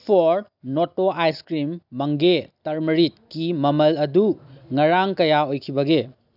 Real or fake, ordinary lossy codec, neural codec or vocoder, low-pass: real; none; none; 5.4 kHz